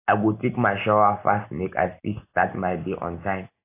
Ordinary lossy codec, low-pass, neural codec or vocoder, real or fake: AAC, 24 kbps; 3.6 kHz; none; real